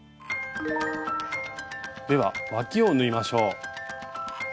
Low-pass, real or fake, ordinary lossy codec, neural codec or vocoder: none; real; none; none